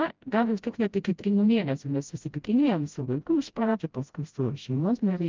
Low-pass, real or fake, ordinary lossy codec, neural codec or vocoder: 7.2 kHz; fake; Opus, 24 kbps; codec, 16 kHz, 0.5 kbps, FreqCodec, smaller model